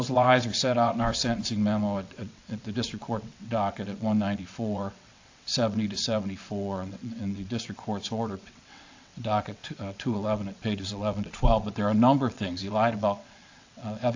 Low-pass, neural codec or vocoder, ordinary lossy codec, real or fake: 7.2 kHz; vocoder, 22.05 kHz, 80 mel bands, WaveNeXt; AAC, 48 kbps; fake